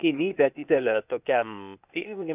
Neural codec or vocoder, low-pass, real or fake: codec, 16 kHz, 0.8 kbps, ZipCodec; 3.6 kHz; fake